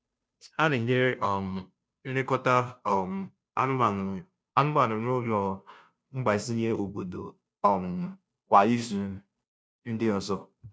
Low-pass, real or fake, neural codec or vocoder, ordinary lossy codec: none; fake; codec, 16 kHz, 0.5 kbps, FunCodec, trained on Chinese and English, 25 frames a second; none